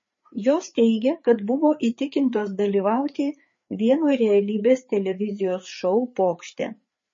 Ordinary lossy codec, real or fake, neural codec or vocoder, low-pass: MP3, 32 kbps; fake; codec, 16 kHz, 4 kbps, FreqCodec, larger model; 7.2 kHz